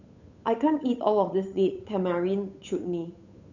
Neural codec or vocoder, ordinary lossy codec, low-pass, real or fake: codec, 16 kHz, 8 kbps, FunCodec, trained on Chinese and English, 25 frames a second; none; 7.2 kHz; fake